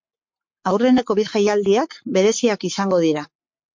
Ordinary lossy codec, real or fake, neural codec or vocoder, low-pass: MP3, 48 kbps; fake; codec, 44.1 kHz, 7.8 kbps, Pupu-Codec; 7.2 kHz